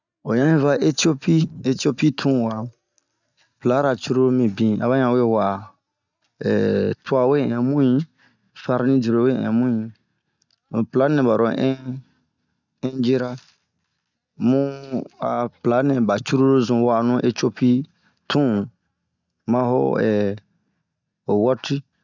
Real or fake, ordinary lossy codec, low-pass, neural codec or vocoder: real; none; 7.2 kHz; none